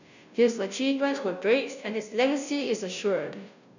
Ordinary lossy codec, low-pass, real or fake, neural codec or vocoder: none; 7.2 kHz; fake; codec, 16 kHz, 0.5 kbps, FunCodec, trained on Chinese and English, 25 frames a second